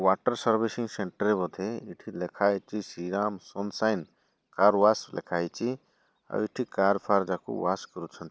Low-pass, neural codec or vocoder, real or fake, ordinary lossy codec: none; none; real; none